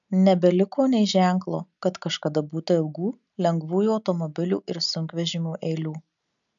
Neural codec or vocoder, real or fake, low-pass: none; real; 7.2 kHz